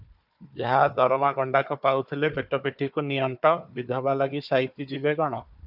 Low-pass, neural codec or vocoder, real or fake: 5.4 kHz; codec, 16 kHz, 4 kbps, FunCodec, trained on Chinese and English, 50 frames a second; fake